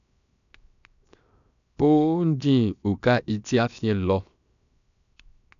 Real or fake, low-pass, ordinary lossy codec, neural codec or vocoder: fake; 7.2 kHz; none; codec, 16 kHz, 0.7 kbps, FocalCodec